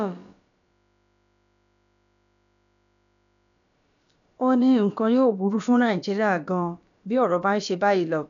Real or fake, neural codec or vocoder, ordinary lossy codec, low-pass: fake; codec, 16 kHz, about 1 kbps, DyCAST, with the encoder's durations; none; 7.2 kHz